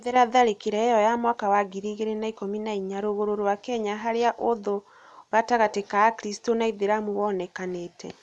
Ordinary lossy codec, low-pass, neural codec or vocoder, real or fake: none; none; none; real